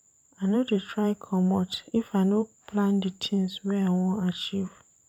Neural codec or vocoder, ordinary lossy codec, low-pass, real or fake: vocoder, 44.1 kHz, 128 mel bands every 512 samples, BigVGAN v2; none; 19.8 kHz; fake